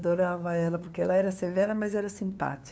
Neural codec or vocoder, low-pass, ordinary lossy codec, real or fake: codec, 16 kHz, 2 kbps, FunCodec, trained on LibriTTS, 25 frames a second; none; none; fake